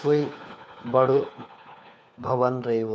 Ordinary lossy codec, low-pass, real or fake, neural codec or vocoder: none; none; fake; codec, 16 kHz, 4 kbps, FunCodec, trained on LibriTTS, 50 frames a second